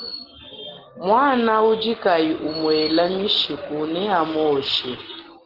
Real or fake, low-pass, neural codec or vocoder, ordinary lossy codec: real; 5.4 kHz; none; Opus, 16 kbps